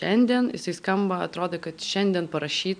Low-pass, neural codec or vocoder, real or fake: 9.9 kHz; none; real